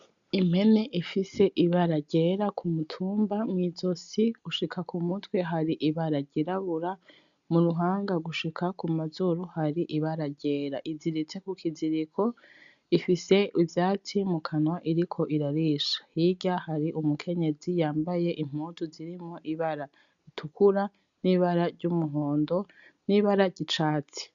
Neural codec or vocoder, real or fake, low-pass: none; real; 7.2 kHz